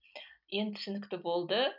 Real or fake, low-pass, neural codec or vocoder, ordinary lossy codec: real; 5.4 kHz; none; none